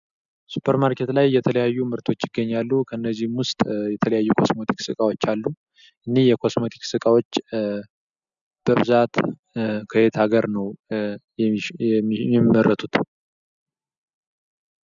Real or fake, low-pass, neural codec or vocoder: real; 7.2 kHz; none